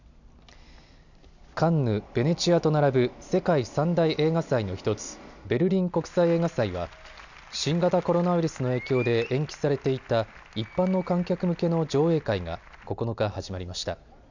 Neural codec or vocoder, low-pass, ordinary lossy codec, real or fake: none; 7.2 kHz; none; real